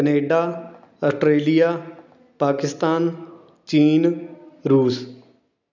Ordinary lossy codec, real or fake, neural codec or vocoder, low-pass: none; real; none; 7.2 kHz